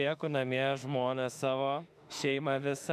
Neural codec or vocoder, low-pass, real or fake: autoencoder, 48 kHz, 32 numbers a frame, DAC-VAE, trained on Japanese speech; 14.4 kHz; fake